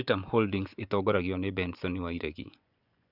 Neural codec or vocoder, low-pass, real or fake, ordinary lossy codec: vocoder, 24 kHz, 100 mel bands, Vocos; 5.4 kHz; fake; none